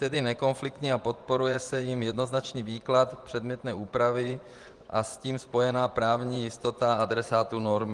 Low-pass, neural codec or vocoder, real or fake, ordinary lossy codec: 10.8 kHz; vocoder, 24 kHz, 100 mel bands, Vocos; fake; Opus, 24 kbps